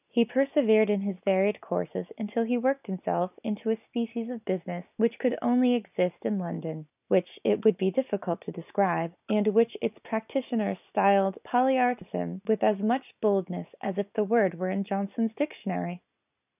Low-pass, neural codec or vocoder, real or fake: 3.6 kHz; none; real